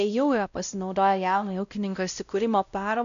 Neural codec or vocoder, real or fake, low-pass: codec, 16 kHz, 0.5 kbps, X-Codec, WavLM features, trained on Multilingual LibriSpeech; fake; 7.2 kHz